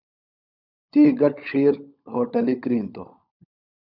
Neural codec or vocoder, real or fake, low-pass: codec, 16 kHz, 16 kbps, FunCodec, trained on LibriTTS, 50 frames a second; fake; 5.4 kHz